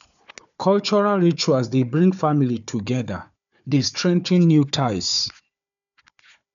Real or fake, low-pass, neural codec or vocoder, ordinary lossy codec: fake; 7.2 kHz; codec, 16 kHz, 4 kbps, FunCodec, trained on Chinese and English, 50 frames a second; none